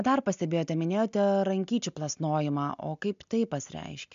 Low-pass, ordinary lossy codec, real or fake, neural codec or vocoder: 7.2 kHz; MP3, 64 kbps; real; none